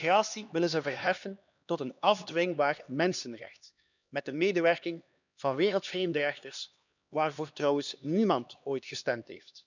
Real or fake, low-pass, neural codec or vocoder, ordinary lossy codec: fake; 7.2 kHz; codec, 16 kHz, 2 kbps, X-Codec, HuBERT features, trained on LibriSpeech; none